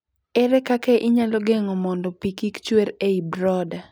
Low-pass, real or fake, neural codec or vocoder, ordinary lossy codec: none; real; none; none